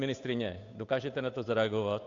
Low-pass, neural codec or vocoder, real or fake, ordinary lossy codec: 7.2 kHz; codec, 16 kHz, 8 kbps, FunCodec, trained on Chinese and English, 25 frames a second; fake; AAC, 48 kbps